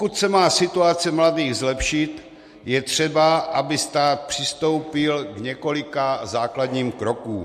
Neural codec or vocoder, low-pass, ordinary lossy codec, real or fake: none; 14.4 kHz; MP3, 64 kbps; real